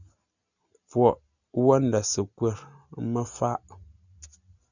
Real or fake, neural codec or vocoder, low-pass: real; none; 7.2 kHz